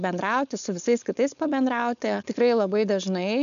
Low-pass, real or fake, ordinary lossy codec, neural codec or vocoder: 7.2 kHz; fake; MP3, 96 kbps; codec, 16 kHz, 4.8 kbps, FACodec